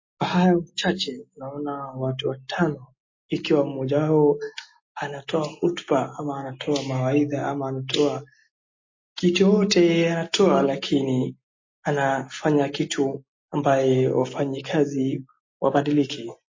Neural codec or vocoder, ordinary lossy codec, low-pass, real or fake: none; MP3, 32 kbps; 7.2 kHz; real